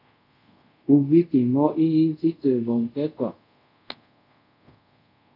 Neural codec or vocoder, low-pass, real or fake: codec, 24 kHz, 0.5 kbps, DualCodec; 5.4 kHz; fake